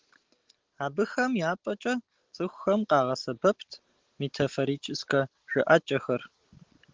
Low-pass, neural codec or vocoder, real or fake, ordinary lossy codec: 7.2 kHz; none; real; Opus, 16 kbps